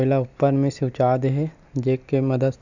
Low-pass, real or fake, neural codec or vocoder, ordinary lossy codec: 7.2 kHz; real; none; none